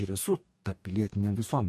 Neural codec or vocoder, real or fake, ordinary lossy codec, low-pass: codec, 44.1 kHz, 2.6 kbps, DAC; fake; MP3, 64 kbps; 14.4 kHz